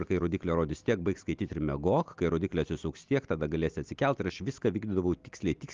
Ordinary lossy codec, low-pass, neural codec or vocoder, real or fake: Opus, 32 kbps; 7.2 kHz; none; real